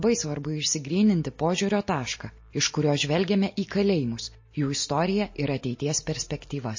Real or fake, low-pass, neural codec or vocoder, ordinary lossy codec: real; 7.2 kHz; none; MP3, 32 kbps